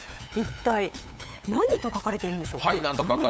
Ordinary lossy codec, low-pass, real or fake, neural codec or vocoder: none; none; fake; codec, 16 kHz, 8 kbps, FunCodec, trained on LibriTTS, 25 frames a second